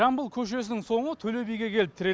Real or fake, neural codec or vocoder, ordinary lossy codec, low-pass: real; none; none; none